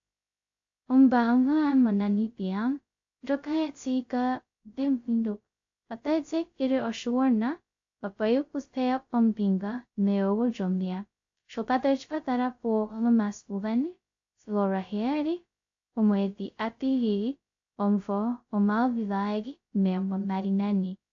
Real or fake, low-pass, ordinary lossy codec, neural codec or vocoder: fake; 7.2 kHz; AAC, 48 kbps; codec, 16 kHz, 0.2 kbps, FocalCodec